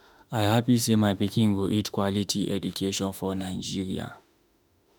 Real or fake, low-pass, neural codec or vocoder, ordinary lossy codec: fake; none; autoencoder, 48 kHz, 32 numbers a frame, DAC-VAE, trained on Japanese speech; none